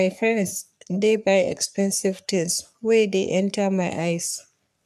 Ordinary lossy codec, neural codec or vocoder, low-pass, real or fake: none; codec, 44.1 kHz, 3.4 kbps, Pupu-Codec; 14.4 kHz; fake